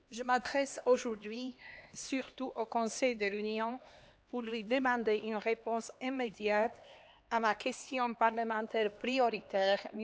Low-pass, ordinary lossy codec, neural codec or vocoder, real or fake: none; none; codec, 16 kHz, 2 kbps, X-Codec, HuBERT features, trained on LibriSpeech; fake